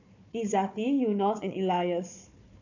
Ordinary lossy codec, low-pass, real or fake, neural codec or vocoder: none; 7.2 kHz; fake; codec, 16 kHz, 4 kbps, FunCodec, trained on Chinese and English, 50 frames a second